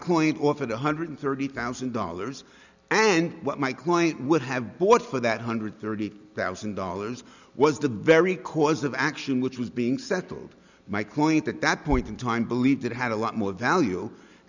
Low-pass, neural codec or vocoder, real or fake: 7.2 kHz; none; real